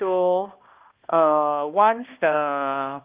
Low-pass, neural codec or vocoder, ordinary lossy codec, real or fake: 3.6 kHz; codec, 16 kHz, 1 kbps, X-Codec, HuBERT features, trained on balanced general audio; Opus, 32 kbps; fake